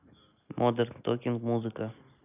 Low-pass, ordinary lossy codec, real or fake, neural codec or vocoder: 3.6 kHz; none; real; none